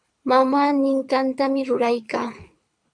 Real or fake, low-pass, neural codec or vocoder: fake; 9.9 kHz; codec, 24 kHz, 6 kbps, HILCodec